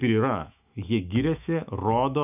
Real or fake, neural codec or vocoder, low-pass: fake; autoencoder, 48 kHz, 128 numbers a frame, DAC-VAE, trained on Japanese speech; 3.6 kHz